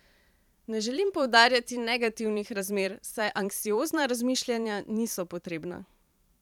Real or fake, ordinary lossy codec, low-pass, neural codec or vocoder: fake; none; 19.8 kHz; vocoder, 44.1 kHz, 128 mel bands every 512 samples, BigVGAN v2